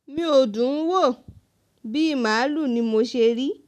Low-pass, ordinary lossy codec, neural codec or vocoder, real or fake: 14.4 kHz; none; none; real